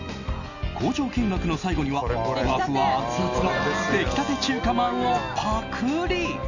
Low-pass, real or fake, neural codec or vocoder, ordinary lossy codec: 7.2 kHz; real; none; none